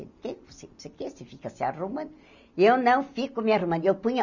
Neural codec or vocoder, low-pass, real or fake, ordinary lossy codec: none; 7.2 kHz; real; none